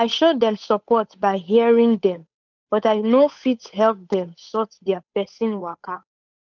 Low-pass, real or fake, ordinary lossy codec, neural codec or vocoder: 7.2 kHz; fake; none; codec, 16 kHz, 8 kbps, FunCodec, trained on Chinese and English, 25 frames a second